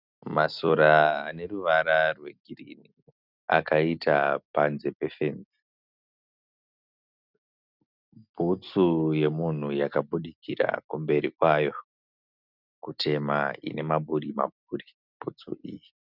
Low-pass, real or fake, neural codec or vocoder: 5.4 kHz; real; none